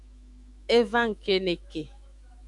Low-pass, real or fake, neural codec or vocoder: 10.8 kHz; fake; autoencoder, 48 kHz, 128 numbers a frame, DAC-VAE, trained on Japanese speech